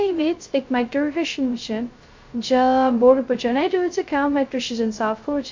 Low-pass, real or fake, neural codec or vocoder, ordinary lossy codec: 7.2 kHz; fake; codec, 16 kHz, 0.2 kbps, FocalCodec; MP3, 48 kbps